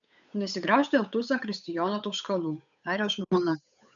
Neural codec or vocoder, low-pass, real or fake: codec, 16 kHz, 8 kbps, FunCodec, trained on Chinese and English, 25 frames a second; 7.2 kHz; fake